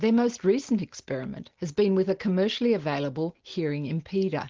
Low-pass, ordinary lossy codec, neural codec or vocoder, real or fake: 7.2 kHz; Opus, 16 kbps; none; real